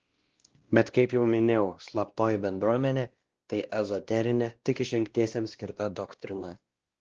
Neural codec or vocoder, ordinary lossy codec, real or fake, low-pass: codec, 16 kHz, 1 kbps, X-Codec, WavLM features, trained on Multilingual LibriSpeech; Opus, 16 kbps; fake; 7.2 kHz